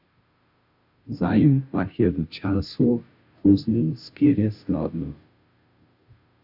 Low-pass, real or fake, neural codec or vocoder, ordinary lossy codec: 5.4 kHz; fake; codec, 16 kHz, 0.5 kbps, FunCodec, trained on Chinese and English, 25 frames a second; Opus, 64 kbps